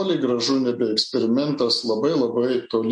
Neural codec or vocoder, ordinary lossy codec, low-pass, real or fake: none; MP3, 48 kbps; 10.8 kHz; real